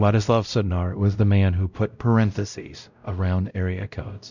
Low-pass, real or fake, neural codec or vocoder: 7.2 kHz; fake; codec, 16 kHz, 0.5 kbps, X-Codec, WavLM features, trained on Multilingual LibriSpeech